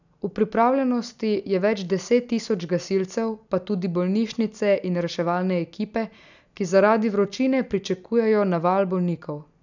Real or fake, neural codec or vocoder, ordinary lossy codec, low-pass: real; none; none; 7.2 kHz